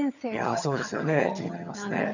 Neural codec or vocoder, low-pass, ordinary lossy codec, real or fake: vocoder, 22.05 kHz, 80 mel bands, HiFi-GAN; 7.2 kHz; none; fake